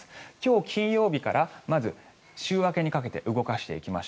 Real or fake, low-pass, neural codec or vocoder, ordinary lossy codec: real; none; none; none